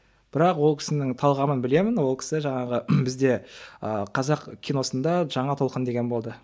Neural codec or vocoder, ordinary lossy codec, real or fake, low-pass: none; none; real; none